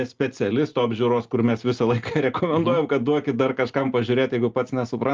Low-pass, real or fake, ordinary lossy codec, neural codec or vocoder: 7.2 kHz; real; Opus, 24 kbps; none